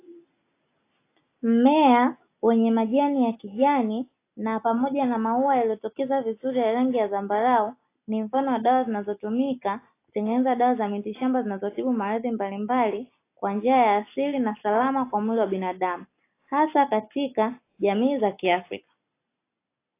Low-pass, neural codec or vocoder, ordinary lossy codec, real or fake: 3.6 kHz; none; AAC, 24 kbps; real